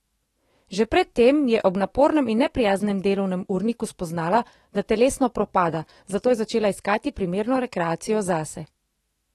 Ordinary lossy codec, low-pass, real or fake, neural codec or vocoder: AAC, 32 kbps; 19.8 kHz; fake; autoencoder, 48 kHz, 128 numbers a frame, DAC-VAE, trained on Japanese speech